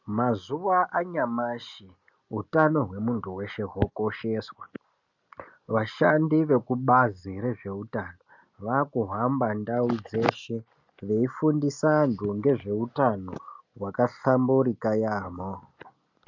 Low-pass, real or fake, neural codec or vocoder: 7.2 kHz; real; none